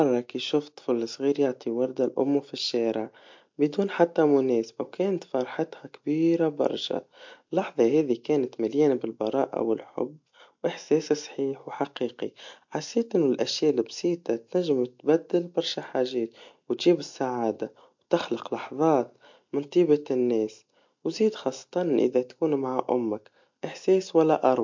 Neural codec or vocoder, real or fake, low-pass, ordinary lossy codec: none; real; 7.2 kHz; MP3, 48 kbps